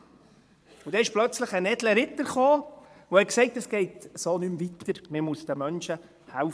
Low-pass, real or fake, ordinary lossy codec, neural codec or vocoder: none; fake; none; vocoder, 22.05 kHz, 80 mel bands, Vocos